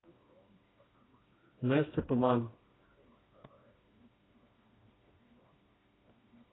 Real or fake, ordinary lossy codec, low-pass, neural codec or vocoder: fake; AAC, 16 kbps; 7.2 kHz; codec, 16 kHz, 2 kbps, FreqCodec, smaller model